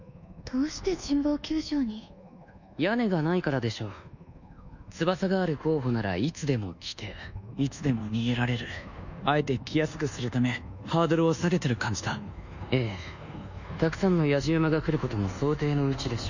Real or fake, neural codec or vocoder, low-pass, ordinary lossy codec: fake; codec, 24 kHz, 1.2 kbps, DualCodec; 7.2 kHz; none